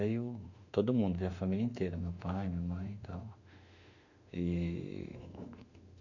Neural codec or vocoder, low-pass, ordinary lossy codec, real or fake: autoencoder, 48 kHz, 32 numbers a frame, DAC-VAE, trained on Japanese speech; 7.2 kHz; none; fake